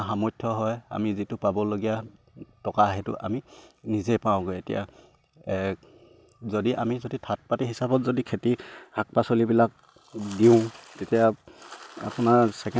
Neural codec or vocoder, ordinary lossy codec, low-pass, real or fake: none; none; none; real